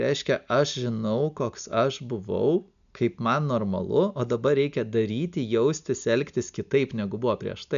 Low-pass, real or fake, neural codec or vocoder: 7.2 kHz; real; none